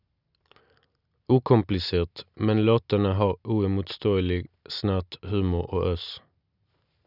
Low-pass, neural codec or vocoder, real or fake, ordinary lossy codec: 5.4 kHz; none; real; none